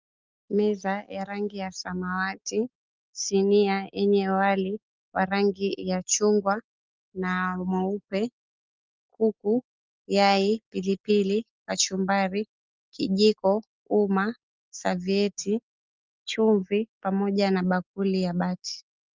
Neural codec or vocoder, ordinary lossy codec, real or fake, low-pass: none; Opus, 32 kbps; real; 7.2 kHz